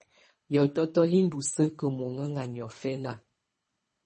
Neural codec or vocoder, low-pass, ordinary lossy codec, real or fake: codec, 24 kHz, 3 kbps, HILCodec; 10.8 kHz; MP3, 32 kbps; fake